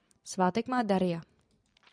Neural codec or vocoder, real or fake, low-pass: vocoder, 44.1 kHz, 128 mel bands every 256 samples, BigVGAN v2; fake; 9.9 kHz